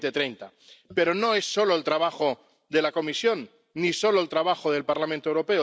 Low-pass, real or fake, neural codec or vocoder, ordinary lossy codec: none; real; none; none